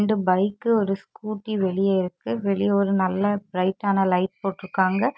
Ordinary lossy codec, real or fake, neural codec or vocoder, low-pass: none; real; none; none